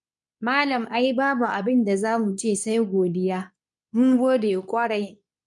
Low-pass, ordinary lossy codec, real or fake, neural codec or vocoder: 10.8 kHz; none; fake; codec, 24 kHz, 0.9 kbps, WavTokenizer, medium speech release version 2